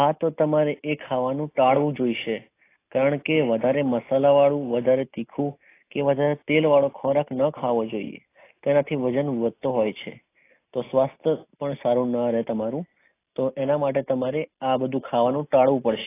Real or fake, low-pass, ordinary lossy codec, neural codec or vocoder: real; 3.6 kHz; AAC, 24 kbps; none